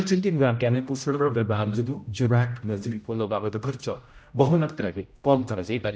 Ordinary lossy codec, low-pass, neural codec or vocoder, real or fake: none; none; codec, 16 kHz, 0.5 kbps, X-Codec, HuBERT features, trained on general audio; fake